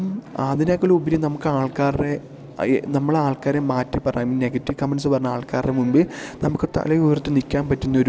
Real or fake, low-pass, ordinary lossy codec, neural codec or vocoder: real; none; none; none